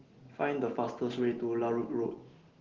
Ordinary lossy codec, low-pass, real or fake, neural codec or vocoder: Opus, 16 kbps; 7.2 kHz; real; none